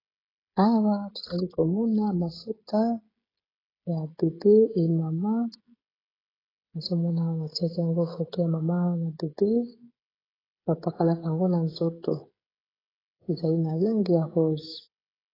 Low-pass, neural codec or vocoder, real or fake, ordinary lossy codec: 5.4 kHz; codec, 16 kHz, 16 kbps, FreqCodec, smaller model; fake; AAC, 24 kbps